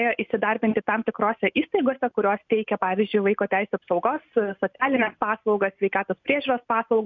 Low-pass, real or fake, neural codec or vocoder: 7.2 kHz; real; none